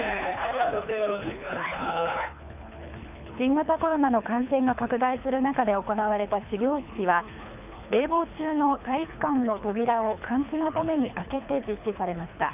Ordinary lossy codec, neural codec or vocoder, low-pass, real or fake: none; codec, 24 kHz, 3 kbps, HILCodec; 3.6 kHz; fake